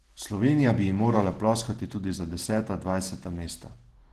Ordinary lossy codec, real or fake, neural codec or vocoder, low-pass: Opus, 16 kbps; fake; vocoder, 48 kHz, 128 mel bands, Vocos; 14.4 kHz